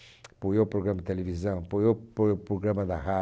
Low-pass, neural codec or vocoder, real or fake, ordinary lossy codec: none; none; real; none